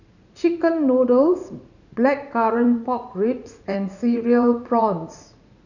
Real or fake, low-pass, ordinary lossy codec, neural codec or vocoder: fake; 7.2 kHz; none; vocoder, 44.1 kHz, 80 mel bands, Vocos